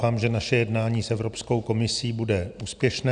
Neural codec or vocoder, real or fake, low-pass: none; real; 9.9 kHz